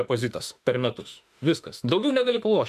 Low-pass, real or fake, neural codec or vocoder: 14.4 kHz; fake; autoencoder, 48 kHz, 32 numbers a frame, DAC-VAE, trained on Japanese speech